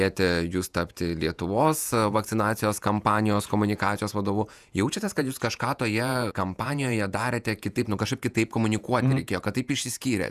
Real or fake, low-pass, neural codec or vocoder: fake; 14.4 kHz; vocoder, 48 kHz, 128 mel bands, Vocos